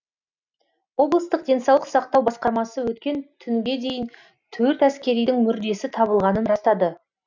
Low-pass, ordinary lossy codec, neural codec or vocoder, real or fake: 7.2 kHz; none; none; real